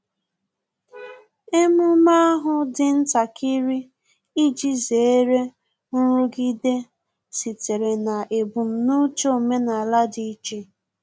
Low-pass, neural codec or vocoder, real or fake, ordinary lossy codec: none; none; real; none